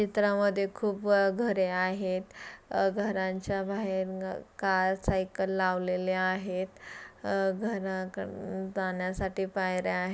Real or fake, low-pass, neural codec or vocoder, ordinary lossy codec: real; none; none; none